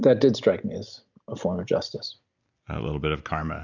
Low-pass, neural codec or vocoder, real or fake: 7.2 kHz; none; real